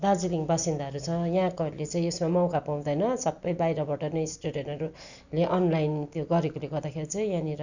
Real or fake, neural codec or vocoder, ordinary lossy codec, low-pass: real; none; none; 7.2 kHz